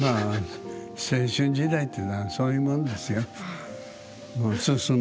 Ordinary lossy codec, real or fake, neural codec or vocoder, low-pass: none; real; none; none